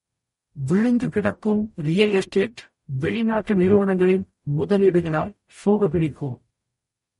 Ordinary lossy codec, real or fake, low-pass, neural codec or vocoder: MP3, 48 kbps; fake; 19.8 kHz; codec, 44.1 kHz, 0.9 kbps, DAC